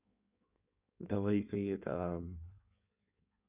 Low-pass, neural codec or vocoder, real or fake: 3.6 kHz; codec, 16 kHz in and 24 kHz out, 1.1 kbps, FireRedTTS-2 codec; fake